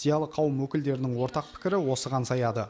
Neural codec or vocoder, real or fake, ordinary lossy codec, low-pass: none; real; none; none